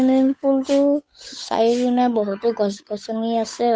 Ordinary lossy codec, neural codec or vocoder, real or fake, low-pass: none; codec, 16 kHz, 8 kbps, FunCodec, trained on Chinese and English, 25 frames a second; fake; none